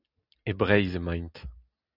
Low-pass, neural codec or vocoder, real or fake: 5.4 kHz; none; real